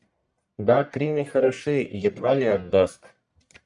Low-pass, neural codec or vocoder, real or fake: 10.8 kHz; codec, 44.1 kHz, 1.7 kbps, Pupu-Codec; fake